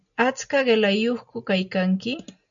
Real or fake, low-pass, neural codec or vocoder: real; 7.2 kHz; none